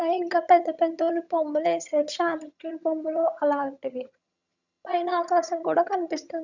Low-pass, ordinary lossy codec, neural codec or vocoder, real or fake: 7.2 kHz; none; vocoder, 22.05 kHz, 80 mel bands, HiFi-GAN; fake